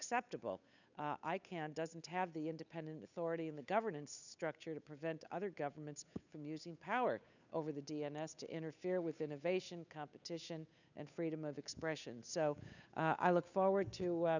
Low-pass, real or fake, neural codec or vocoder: 7.2 kHz; fake; codec, 16 kHz, 8 kbps, FunCodec, trained on Chinese and English, 25 frames a second